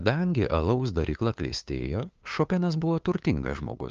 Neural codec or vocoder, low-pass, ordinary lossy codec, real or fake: codec, 16 kHz, 2 kbps, FunCodec, trained on LibriTTS, 25 frames a second; 7.2 kHz; Opus, 24 kbps; fake